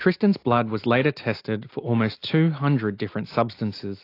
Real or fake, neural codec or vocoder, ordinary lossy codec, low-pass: real; none; AAC, 32 kbps; 5.4 kHz